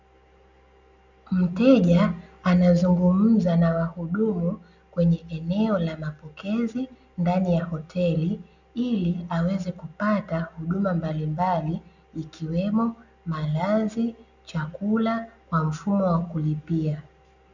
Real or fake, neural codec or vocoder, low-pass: real; none; 7.2 kHz